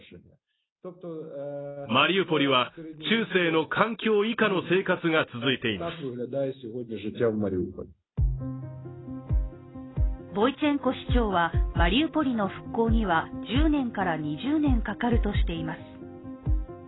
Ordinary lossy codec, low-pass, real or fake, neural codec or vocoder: AAC, 16 kbps; 7.2 kHz; real; none